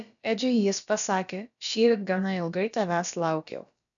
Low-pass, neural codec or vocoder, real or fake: 7.2 kHz; codec, 16 kHz, about 1 kbps, DyCAST, with the encoder's durations; fake